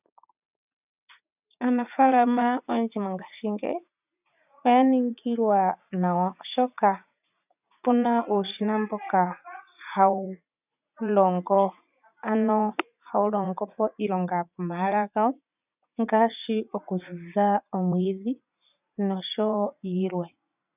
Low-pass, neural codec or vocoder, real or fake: 3.6 kHz; vocoder, 44.1 kHz, 80 mel bands, Vocos; fake